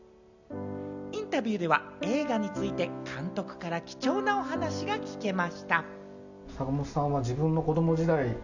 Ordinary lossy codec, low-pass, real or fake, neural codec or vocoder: none; 7.2 kHz; real; none